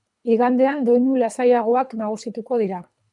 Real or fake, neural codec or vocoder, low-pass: fake; codec, 24 kHz, 3 kbps, HILCodec; 10.8 kHz